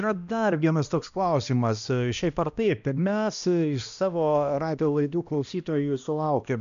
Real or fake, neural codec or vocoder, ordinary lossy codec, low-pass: fake; codec, 16 kHz, 1 kbps, X-Codec, HuBERT features, trained on balanced general audio; MP3, 96 kbps; 7.2 kHz